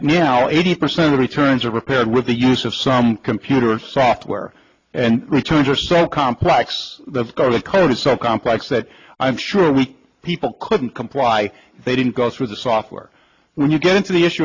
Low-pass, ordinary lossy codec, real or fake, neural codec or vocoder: 7.2 kHz; AAC, 48 kbps; real; none